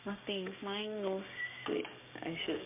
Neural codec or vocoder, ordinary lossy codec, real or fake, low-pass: codec, 16 kHz, 6 kbps, DAC; MP3, 32 kbps; fake; 3.6 kHz